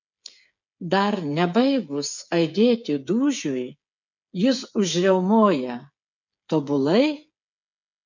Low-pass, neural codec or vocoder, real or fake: 7.2 kHz; codec, 16 kHz, 8 kbps, FreqCodec, smaller model; fake